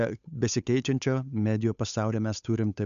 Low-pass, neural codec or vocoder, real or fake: 7.2 kHz; codec, 16 kHz, 8 kbps, FunCodec, trained on Chinese and English, 25 frames a second; fake